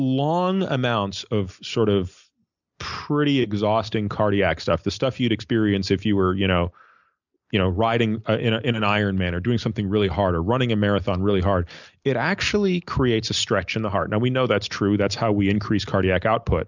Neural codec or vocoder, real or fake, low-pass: none; real; 7.2 kHz